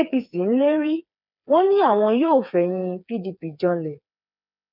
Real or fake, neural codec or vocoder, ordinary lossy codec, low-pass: fake; codec, 16 kHz, 4 kbps, FreqCodec, smaller model; none; 5.4 kHz